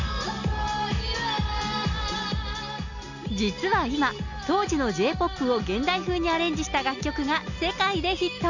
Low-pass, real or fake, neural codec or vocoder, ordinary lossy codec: 7.2 kHz; real; none; none